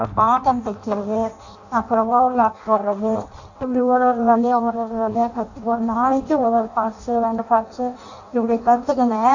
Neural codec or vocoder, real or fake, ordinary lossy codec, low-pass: codec, 16 kHz in and 24 kHz out, 0.6 kbps, FireRedTTS-2 codec; fake; none; 7.2 kHz